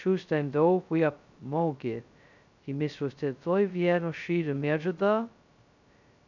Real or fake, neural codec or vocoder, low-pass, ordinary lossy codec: fake; codec, 16 kHz, 0.2 kbps, FocalCodec; 7.2 kHz; none